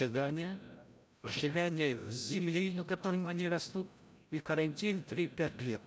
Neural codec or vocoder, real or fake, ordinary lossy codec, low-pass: codec, 16 kHz, 0.5 kbps, FreqCodec, larger model; fake; none; none